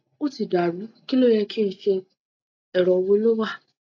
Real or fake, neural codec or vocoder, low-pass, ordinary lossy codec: real; none; 7.2 kHz; AAC, 48 kbps